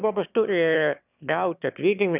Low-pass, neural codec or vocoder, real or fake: 3.6 kHz; autoencoder, 22.05 kHz, a latent of 192 numbers a frame, VITS, trained on one speaker; fake